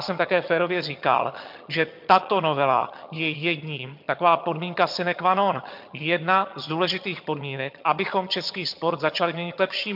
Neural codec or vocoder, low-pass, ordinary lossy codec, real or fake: vocoder, 22.05 kHz, 80 mel bands, HiFi-GAN; 5.4 kHz; MP3, 48 kbps; fake